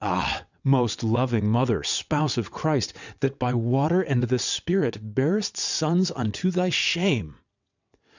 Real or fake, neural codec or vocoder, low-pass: fake; vocoder, 22.05 kHz, 80 mel bands, WaveNeXt; 7.2 kHz